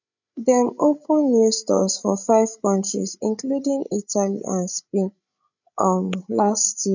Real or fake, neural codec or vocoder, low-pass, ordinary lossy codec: fake; codec, 16 kHz, 16 kbps, FreqCodec, larger model; 7.2 kHz; none